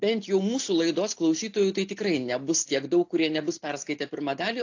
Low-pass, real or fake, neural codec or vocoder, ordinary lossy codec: 7.2 kHz; real; none; AAC, 48 kbps